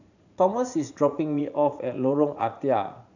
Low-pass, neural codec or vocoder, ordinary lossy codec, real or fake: 7.2 kHz; vocoder, 44.1 kHz, 80 mel bands, Vocos; none; fake